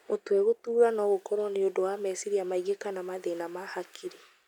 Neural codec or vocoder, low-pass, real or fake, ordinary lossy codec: none; 19.8 kHz; real; none